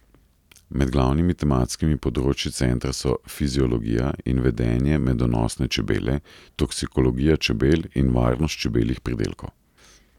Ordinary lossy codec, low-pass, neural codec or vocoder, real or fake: none; 19.8 kHz; none; real